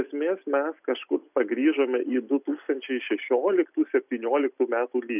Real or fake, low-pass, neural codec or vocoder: real; 3.6 kHz; none